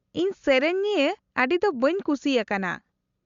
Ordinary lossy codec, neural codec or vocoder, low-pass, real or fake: Opus, 64 kbps; none; 7.2 kHz; real